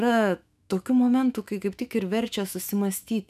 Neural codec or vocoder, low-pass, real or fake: autoencoder, 48 kHz, 128 numbers a frame, DAC-VAE, trained on Japanese speech; 14.4 kHz; fake